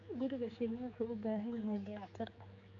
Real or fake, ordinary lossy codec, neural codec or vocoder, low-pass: fake; none; codec, 16 kHz, 4 kbps, X-Codec, HuBERT features, trained on general audio; 7.2 kHz